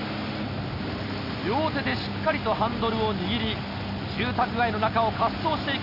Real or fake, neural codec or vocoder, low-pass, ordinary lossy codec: real; none; 5.4 kHz; none